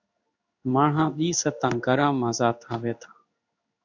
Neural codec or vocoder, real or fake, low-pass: codec, 16 kHz in and 24 kHz out, 1 kbps, XY-Tokenizer; fake; 7.2 kHz